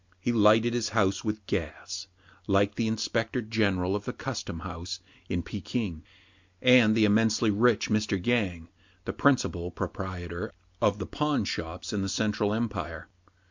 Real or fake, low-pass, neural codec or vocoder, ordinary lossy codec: real; 7.2 kHz; none; MP3, 64 kbps